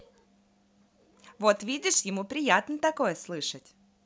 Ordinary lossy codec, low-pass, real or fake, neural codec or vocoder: none; none; real; none